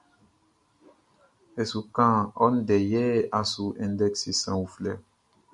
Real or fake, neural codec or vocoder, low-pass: real; none; 10.8 kHz